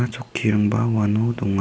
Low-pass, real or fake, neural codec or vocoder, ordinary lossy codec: none; real; none; none